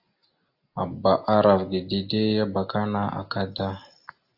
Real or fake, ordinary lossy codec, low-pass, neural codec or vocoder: real; AAC, 48 kbps; 5.4 kHz; none